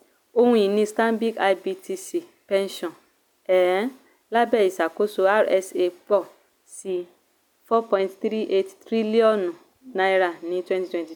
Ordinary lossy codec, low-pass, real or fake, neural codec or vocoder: none; 19.8 kHz; real; none